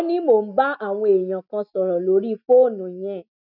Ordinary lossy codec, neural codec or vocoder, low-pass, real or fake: none; none; 5.4 kHz; real